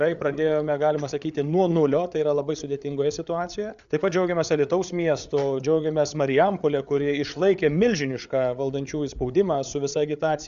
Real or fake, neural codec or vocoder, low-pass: fake; codec, 16 kHz, 8 kbps, FreqCodec, larger model; 7.2 kHz